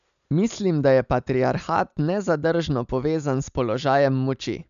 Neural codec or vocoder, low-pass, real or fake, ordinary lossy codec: none; 7.2 kHz; real; none